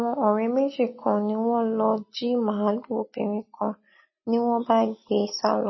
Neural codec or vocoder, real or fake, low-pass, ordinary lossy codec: none; real; 7.2 kHz; MP3, 24 kbps